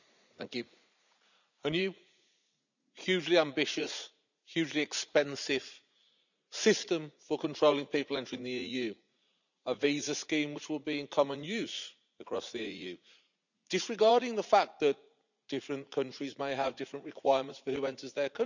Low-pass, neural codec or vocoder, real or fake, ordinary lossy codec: 7.2 kHz; vocoder, 44.1 kHz, 80 mel bands, Vocos; fake; none